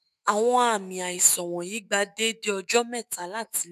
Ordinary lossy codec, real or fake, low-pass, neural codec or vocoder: none; fake; 14.4 kHz; autoencoder, 48 kHz, 128 numbers a frame, DAC-VAE, trained on Japanese speech